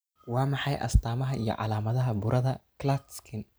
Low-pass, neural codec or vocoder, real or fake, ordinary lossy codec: none; none; real; none